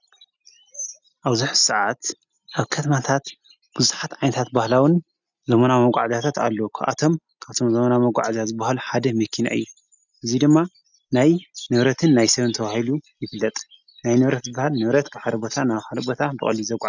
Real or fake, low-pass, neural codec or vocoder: real; 7.2 kHz; none